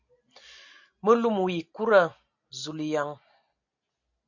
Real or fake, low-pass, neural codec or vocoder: real; 7.2 kHz; none